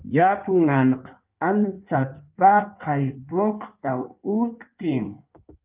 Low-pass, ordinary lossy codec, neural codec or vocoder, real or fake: 3.6 kHz; Opus, 32 kbps; codec, 16 kHz in and 24 kHz out, 1.1 kbps, FireRedTTS-2 codec; fake